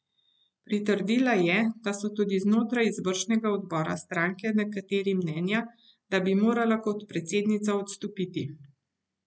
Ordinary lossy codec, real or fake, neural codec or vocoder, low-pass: none; real; none; none